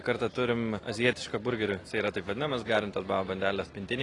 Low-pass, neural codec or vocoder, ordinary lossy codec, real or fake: 10.8 kHz; none; AAC, 32 kbps; real